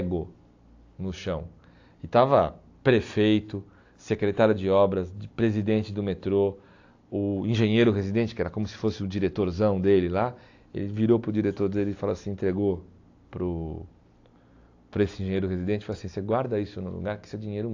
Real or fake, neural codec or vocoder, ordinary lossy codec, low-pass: real; none; AAC, 48 kbps; 7.2 kHz